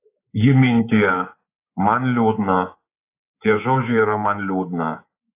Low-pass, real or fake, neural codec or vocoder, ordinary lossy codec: 3.6 kHz; fake; codec, 24 kHz, 3.1 kbps, DualCodec; AAC, 24 kbps